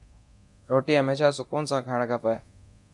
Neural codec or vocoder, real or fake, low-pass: codec, 24 kHz, 0.9 kbps, DualCodec; fake; 10.8 kHz